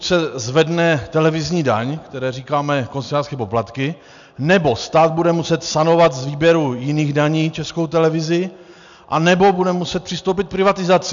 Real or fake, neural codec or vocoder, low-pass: real; none; 7.2 kHz